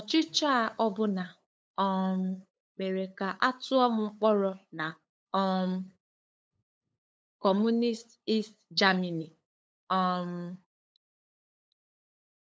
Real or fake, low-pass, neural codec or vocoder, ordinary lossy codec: fake; none; codec, 16 kHz, 8 kbps, FunCodec, trained on LibriTTS, 25 frames a second; none